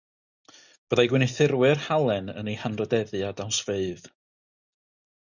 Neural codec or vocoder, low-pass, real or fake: none; 7.2 kHz; real